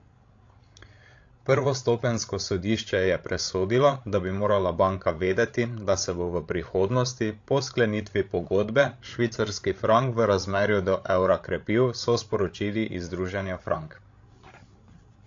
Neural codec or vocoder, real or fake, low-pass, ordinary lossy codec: codec, 16 kHz, 16 kbps, FreqCodec, larger model; fake; 7.2 kHz; AAC, 48 kbps